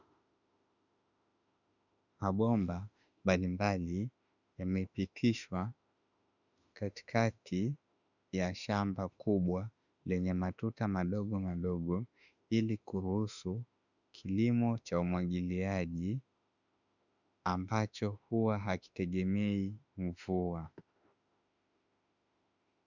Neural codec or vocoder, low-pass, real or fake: autoencoder, 48 kHz, 32 numbers a frame, DAC-VAE, trained on Japanese speech; 7.2 kHz; fake